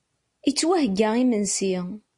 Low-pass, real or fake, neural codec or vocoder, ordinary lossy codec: 10.8 kHz; real; none; MP3, 48 kbps